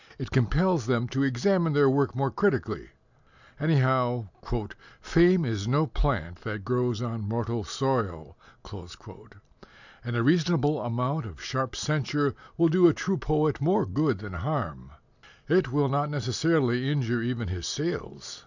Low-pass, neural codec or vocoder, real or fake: 7.2 kHz; none; real